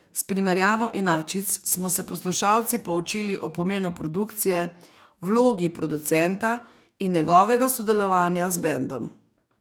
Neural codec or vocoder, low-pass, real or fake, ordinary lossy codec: codec, 44.1 kHz, 2.6 kbps, DAC; none; fake; none